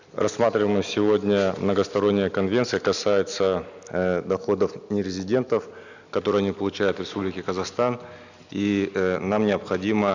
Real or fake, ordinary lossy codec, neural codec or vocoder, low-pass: real; none; none; 7.2 kHz